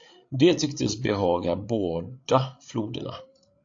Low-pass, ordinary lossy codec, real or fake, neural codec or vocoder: 7.2 kHz; AAC, 48 kbps; fake; codec, 16 kHz, 8 kbps, FreqCodec, larger model